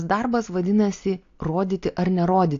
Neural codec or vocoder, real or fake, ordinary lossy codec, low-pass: none; real; AAC, 48 kbps; 7.2 kHz